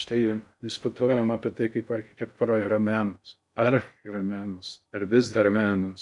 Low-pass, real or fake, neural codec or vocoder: 10.8 kHz; fake; codec, 16 kHz in and 24 kHz out, 0.6 kbps, FocalCodec, streaming, 4096 codes